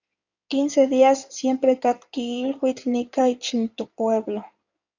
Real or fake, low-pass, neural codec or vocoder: fake; 7.2 kHz; codec, 16 kHz in and 24 kHz out, 2.2 kbps, FireRedTTS-2 codec